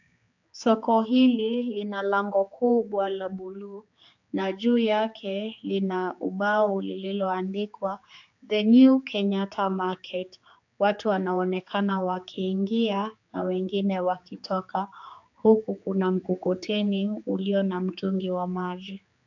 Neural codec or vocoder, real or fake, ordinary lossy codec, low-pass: codec, 16 kHz, 4 kbps, X-Codec, HuBERT features, trained on general audio; fake; AAC, 48 kbps; 7.2 kHz